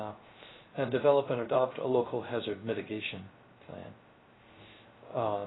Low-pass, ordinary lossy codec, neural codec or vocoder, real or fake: 7.2 kHz; AAC, 16 kbps; codec, 16 kHz, 0.3 kbps, FocalCodec; fake